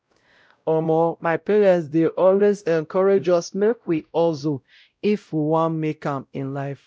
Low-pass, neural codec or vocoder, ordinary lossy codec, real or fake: none; codec, 16 kHz, 0.5 kbps, X-Codec, WavLM features, trained on Multilingual LibriSpeech; none; fake